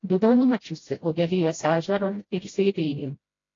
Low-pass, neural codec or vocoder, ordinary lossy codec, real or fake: 7.2 kHz; codec, 16 kHz, 0.5 kbps, FreqCodec, smaller model; AAC, 32 kbps; fake